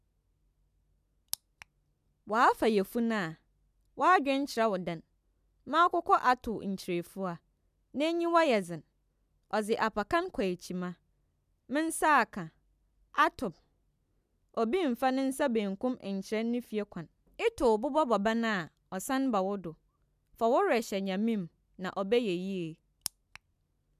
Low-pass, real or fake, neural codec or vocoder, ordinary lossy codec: 14.4 kHz; real; none; none